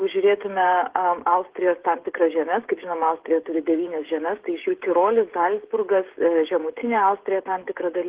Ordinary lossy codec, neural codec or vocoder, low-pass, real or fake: Opus, 16 kbps; codec, 16 kHz, 16 kbps, FreqCodec, smaller model; 3.6 kHz; fake